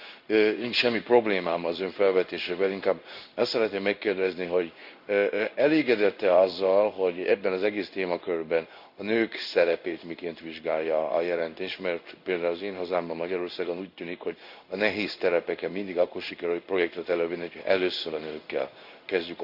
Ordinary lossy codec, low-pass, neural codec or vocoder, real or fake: none; 5.4 kHz; codec, 16 kHz in and 24 kHz out, 1 kbps, XY-Tokenizer; fake